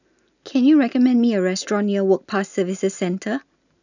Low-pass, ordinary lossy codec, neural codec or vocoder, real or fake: 7.2 kHz; none; none; real